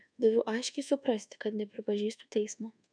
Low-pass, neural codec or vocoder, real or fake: 9.9 kHz; codec, 24 kHz, 1.2 kbps, DualCodec; fake